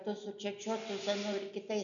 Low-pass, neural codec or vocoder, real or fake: 7.2 kHz; none; real